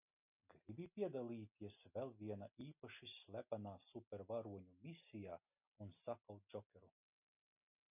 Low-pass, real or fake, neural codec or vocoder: 3.6 kHz; real; none